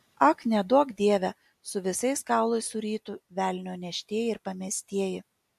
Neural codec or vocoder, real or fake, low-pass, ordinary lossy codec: none; real; 14.4 kHz; MP3, 64 kbps